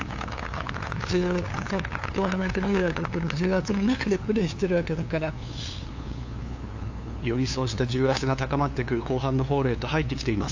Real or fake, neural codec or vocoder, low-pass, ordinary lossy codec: fake; codec, 16 kHz, 2 kbps, FunCodec, trained on LibriTTS, 25 frames a second; 7.2 kHz; AAC, 48 kbps